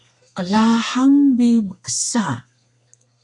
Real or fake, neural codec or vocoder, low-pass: fake; codec, 32 kHz, 1.9 kbps, SNAC; 10.8 kHz